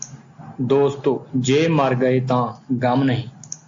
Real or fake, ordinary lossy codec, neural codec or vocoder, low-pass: real; AAC, 64 kbps; none; 7.2 kHz